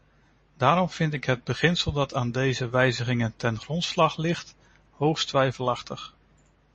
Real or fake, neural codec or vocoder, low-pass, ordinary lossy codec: real; none; 10.8 kHz; MP3, 32 kbps